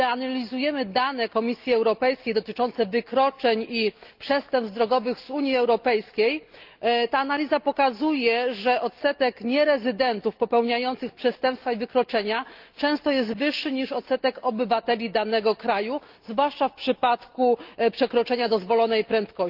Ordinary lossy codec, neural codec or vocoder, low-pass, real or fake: Opus, 32 kbps; none; 5.4 kHz; real